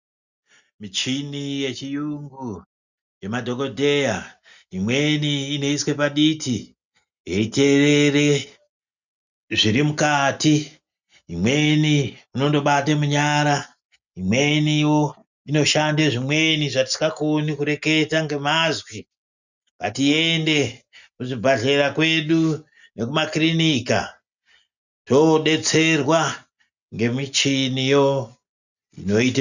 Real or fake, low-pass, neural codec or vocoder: real; 7.2 kHz; none